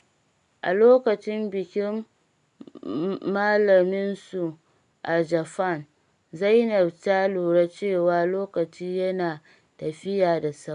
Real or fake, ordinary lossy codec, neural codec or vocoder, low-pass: real; none; none; 10.8 kHz